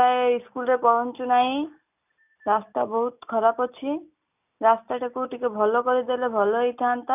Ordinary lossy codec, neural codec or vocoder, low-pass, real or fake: none; none; 3.6 kHz; real